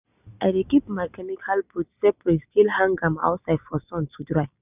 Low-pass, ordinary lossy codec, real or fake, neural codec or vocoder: 3.6 kHz; none; real; none